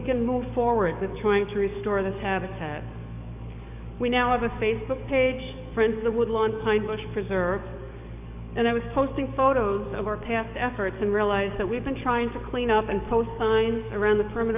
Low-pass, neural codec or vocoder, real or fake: 3.6 kHz; codec, 44.1 kHz, 7.8 kbps, DAC; fake